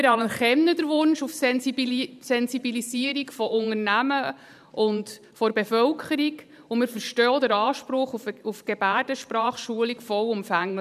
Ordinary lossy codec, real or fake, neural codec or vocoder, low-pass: none; fake; vocoder, 44.1 kHz, 128 mel bands every 512 samples, BigVGAN v2; 14.4 kHz